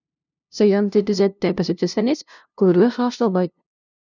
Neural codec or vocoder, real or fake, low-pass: codec, 16 kHz, 0.5 kbps, FunCodec, trained on LibriTTS, 25 frames a second; fake; 7.2 kHz